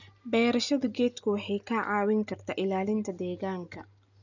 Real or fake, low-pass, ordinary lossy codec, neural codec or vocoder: real; 7.2 kHz; none; none